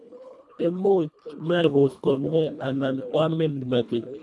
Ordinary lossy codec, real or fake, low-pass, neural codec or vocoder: none; fake; none; codec, 24 kHz, 1.5 kbps, HILCodec